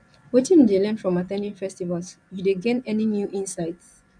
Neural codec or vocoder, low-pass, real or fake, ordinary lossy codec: vocoder, 24 kHz, 100 mel bands, Vocos; 9.9 kHz; fake; none